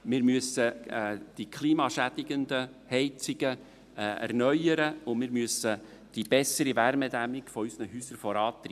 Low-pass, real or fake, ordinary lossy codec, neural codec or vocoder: 14.4 kHz; real; none; none